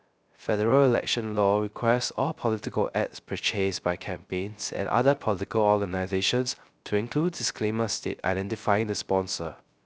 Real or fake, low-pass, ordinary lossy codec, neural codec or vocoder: fake; none; none; codec, 16 kHz, 0.3 kbps, FocalCodec